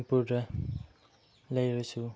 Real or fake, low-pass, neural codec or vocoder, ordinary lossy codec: real; none; none; none